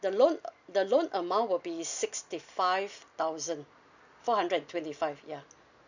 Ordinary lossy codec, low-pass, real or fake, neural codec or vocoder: none; 7.2 kHz; real; none